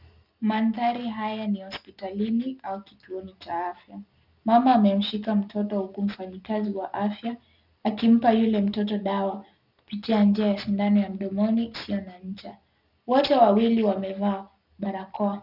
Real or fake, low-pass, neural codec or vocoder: real; 5.4 kHz; none